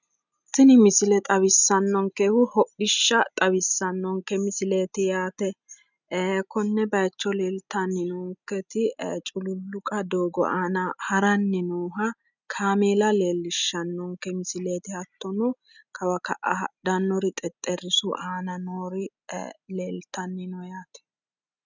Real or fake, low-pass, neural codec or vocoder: real; 7.2 kHz; none